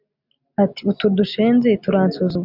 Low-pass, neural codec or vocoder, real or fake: 5.4 kHz; none; real